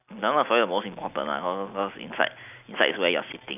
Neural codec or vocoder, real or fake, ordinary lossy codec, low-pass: none; real; none; 3.6 kHz